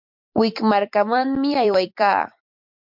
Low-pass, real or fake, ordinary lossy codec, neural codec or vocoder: 5.4 kHz; fake; MP3, 48 kbps; vocoder, 44.1 kHz, 128 mel bands every 256 samples, BigVGAN v2